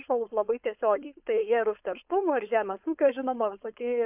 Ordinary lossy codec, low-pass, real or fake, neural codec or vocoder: AAC, 32 kbps; 3.6 kHz; fake; codec, 16 kHz, 4.8 kbps, FACodec